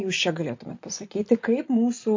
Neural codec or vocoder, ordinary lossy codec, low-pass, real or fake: none; MP3, 48 kbps; 7.2 kHz; real